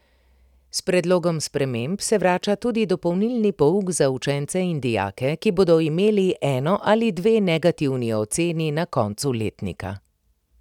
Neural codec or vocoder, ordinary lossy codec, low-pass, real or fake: none; none; 19.8 kHz; real